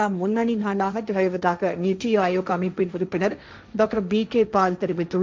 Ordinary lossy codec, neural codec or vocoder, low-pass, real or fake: none; codec, 16 kHz, 1.1 kbps, Voila-Tokenizer; none; fake